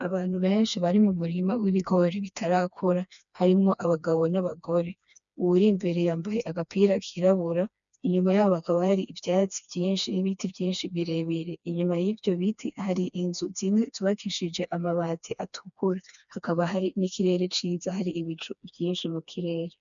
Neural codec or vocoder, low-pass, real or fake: codec, 16 kHz, 2 kbps, FreqCodec, smaller model; 7.2 kHz; fake